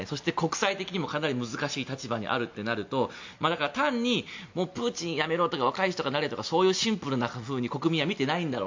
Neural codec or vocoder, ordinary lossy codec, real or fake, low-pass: none; MP3, 48 kbps; real; 7.2 kHz